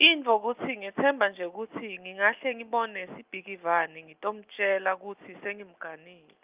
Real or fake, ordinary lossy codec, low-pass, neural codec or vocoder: real; Opus, 24 kbps; 3.6 kHz; none